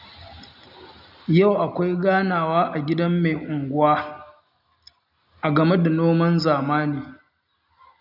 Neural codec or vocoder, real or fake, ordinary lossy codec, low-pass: none; real; none; 5.4 kHz